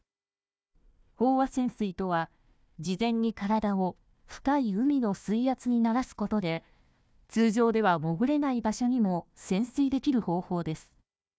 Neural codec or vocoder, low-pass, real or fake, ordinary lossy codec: codec, 16 kHz, 1 kbps, FunCodec, trained on Chinese and English, 50 frames a second; none; fake; none